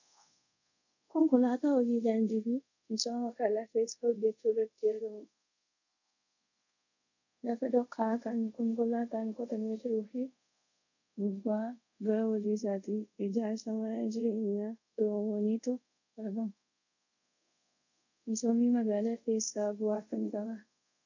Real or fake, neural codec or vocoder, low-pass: fake; codec, 24 kHz, 0.5 kbps, DualCodec; 7.2 kHz